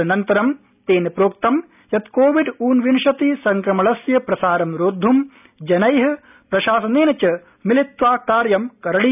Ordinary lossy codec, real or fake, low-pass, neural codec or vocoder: none; real; 3.6 kHz; none